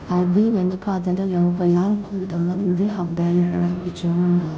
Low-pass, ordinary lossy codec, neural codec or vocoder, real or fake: none; none; codec, 16 kHz, 0.5 kbps, FunCodec, trained on Chinese and English, 25 frames a second; fake